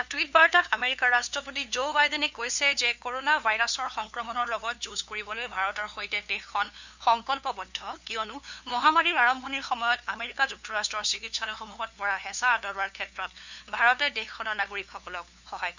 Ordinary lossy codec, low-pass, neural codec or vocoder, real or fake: none; 7.2 kHz; codec, 16 kHz, 2 kbps, FunCodec, trained on LibriTTS, 25 frames a second; fake